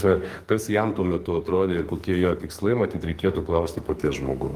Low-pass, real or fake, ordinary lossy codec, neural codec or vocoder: 14.4 kHz; fake; Opus, 32 kbps; codec, 44.1 kHz, 2.6 kbps, SNAC